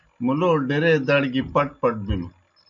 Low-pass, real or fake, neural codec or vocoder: 7.2 kHz; real; none